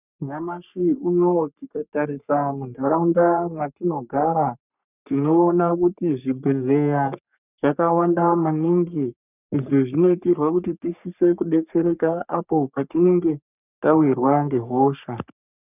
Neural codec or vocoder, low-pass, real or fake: codec, 44.1 kHz, 3.4 kbps, Pupu-Codec; 3.6 kHz; fake